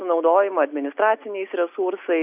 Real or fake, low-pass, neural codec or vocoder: real; 3.6 kHz; none